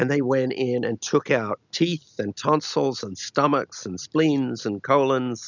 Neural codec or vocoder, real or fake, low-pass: none; real; 7.2 kHz